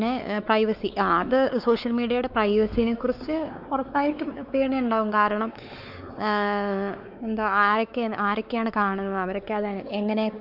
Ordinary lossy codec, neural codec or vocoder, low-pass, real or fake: none; codec, 16 kHz, 4 kbps, X-Codec, WavLM features, trained on Multilingual LibriSpeech; 5.4 kHz; fake